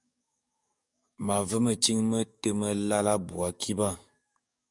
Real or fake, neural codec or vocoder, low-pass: fake; codec, 44.1 kHz, 7.8 kbps, DAC; 10.8 kHz